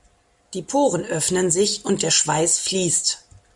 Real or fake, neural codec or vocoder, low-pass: real; none; 10.8 kHz